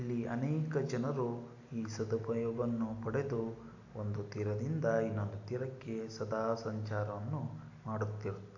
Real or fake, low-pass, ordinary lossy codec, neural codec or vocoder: real; 7.2 kHz; AAC, 48 kbps; none